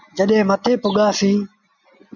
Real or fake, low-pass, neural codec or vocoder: real; 7.2 kHz; none